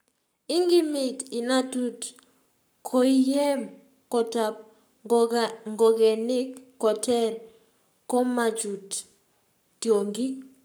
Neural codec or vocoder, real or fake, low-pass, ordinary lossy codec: codec, 44.1 kHz, 7.8 kbps, Pupu-Codec; fake; none; none